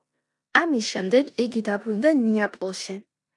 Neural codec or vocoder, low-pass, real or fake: codec, 16 kHz in and 24 kHz out, 0.9 kbps, LongCat-Audio-Codec, four codebook decoder; 10.8 kHz; fake